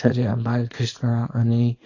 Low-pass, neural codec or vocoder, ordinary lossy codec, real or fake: 7.2 kHz; codec, 24 kHz, 0.9 kbps, WavTokenizer, small release; AAC, 32 kbps; fake